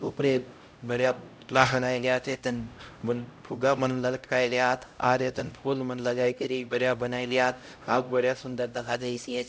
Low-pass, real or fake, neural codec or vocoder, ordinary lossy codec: none; fake; codec, 16 kHz, 0.5 kbps, X-Codec, HuBERT features, trained on LibriSpeech; none